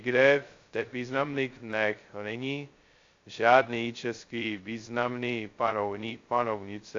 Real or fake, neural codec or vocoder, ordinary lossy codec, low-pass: fake; codec, 16 kHz, 0.2 kbps, FocalCodec; AAC, 48 kbps; 7.2 kHz